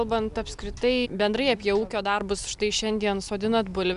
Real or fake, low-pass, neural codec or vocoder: real; 10.8 kHz; none